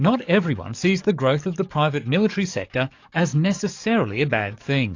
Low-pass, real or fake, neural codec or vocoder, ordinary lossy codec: 7.2 kHz; fake; codec, 44.1 kHz, 7.8 kbps, DAC; AAC, 48 kbps